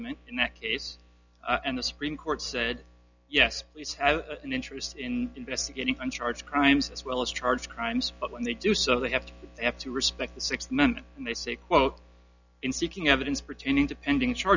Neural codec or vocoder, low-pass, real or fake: none; 7.2 kHz; real